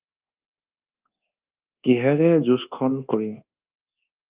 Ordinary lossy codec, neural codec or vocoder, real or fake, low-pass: Opus, 32 kbps; codec, 24 kHz, 1.2 kbps, DualCodec; fake; 3.6 kHz